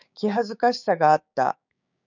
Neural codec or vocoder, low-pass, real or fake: codec, 16 kHz, 6 kbps, DAC; 7.2 kHz; fake